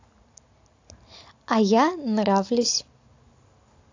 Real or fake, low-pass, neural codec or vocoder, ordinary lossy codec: real; 7.2 kHz; none; AAC, 48 kbps